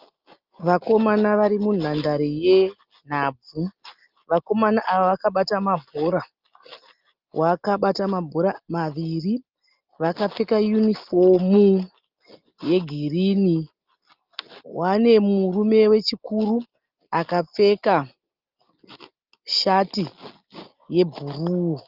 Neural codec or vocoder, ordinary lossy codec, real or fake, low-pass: none; Opus, 32 kbps; real; 5.4 kHz